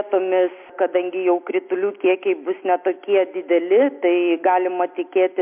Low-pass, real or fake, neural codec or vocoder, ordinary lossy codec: 3.6 kHz; real; none; MP3, 32 kbps